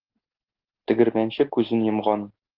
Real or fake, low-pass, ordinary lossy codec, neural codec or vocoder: real; 5.4 kHz; Opus, 16 kbps; none